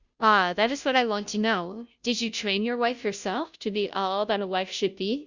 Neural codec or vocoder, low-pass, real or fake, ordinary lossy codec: codec, 16 kHz, 0.5 kbps, FunCodec, trained on Chinese and English, 25 frames a second; 7.2 kHz; fake; Opus, 64 kbps